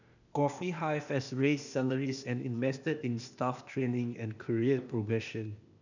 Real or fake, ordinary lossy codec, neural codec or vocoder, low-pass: fake; none; codec, 16 kHz, 0.8 kbps, ZipCodec; 7.2 kHz